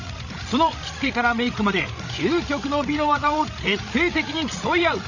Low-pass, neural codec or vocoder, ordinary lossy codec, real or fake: 7.2 kHz; codec, 16 kHz, 16 kbps, FreqCodec, larger model; none; fake